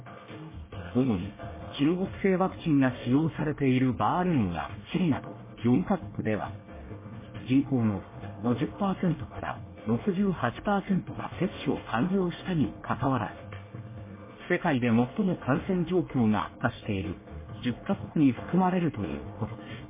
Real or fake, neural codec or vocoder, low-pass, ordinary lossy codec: fake; codec, 24 kHz, 1 kbps, SNAC; 3.6 kHz; MP3, 16 kbps